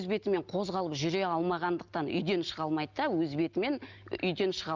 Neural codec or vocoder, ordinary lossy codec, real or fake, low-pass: none; Opus, 24 kbps; real; 7.2 kHz